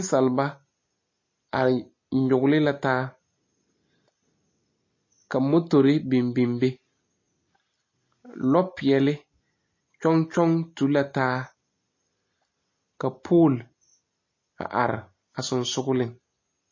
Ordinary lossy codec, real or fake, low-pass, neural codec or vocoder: MP3, 32 kbps; real; 7.2 kHz; none